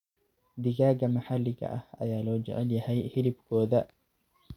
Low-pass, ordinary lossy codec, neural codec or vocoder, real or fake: 19.8 kHz; none; none; real